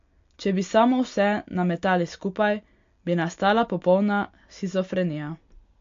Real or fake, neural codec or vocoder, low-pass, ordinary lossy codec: real; none; 7.2 kHz; AAC, 48 kbps